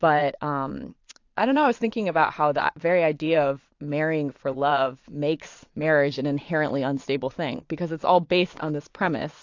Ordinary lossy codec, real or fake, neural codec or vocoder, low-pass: AAC, 48 kbps; fake; vocoder, 22.05 kHz, 80 mel bands, Vocos; 7.2 kHz